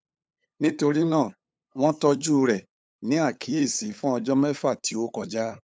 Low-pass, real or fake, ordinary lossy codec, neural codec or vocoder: none; fake; none; codec, 16 kHz, 8 kbps, FunCodec, trained on LibriTTS, 25 frames a second